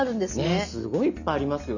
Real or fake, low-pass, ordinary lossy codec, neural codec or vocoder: real; 7.2 kHz; none; none